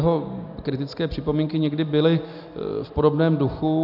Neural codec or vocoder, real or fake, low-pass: none; real; 5.4 kHz